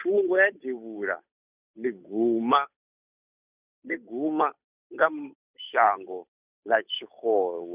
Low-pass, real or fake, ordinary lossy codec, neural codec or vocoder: 3.6 kHz; fake; none; codec, 16 kHz, 8 kbps, FunCodec, trained on Chinese and English, 25 frames a second